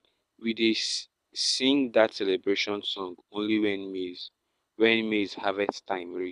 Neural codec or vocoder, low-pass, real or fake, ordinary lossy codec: codec, 24 kHz, 6 kbps, HILCodec; none; fake; none